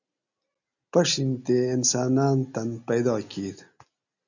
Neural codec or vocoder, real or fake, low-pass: none; real; 7.2 kHz